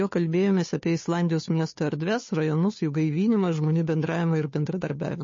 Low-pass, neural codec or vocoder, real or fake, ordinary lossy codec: 7.2 kHz; codec, 16 kHz, 2 kbps, FunCodec, trained on Chinese and English, 25 frames a second; fake; MP3, 32 kbps